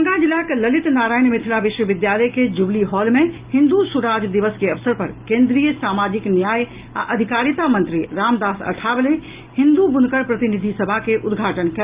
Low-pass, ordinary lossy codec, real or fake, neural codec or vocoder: 3.6 kHz; Opus, 32 kbps; real; none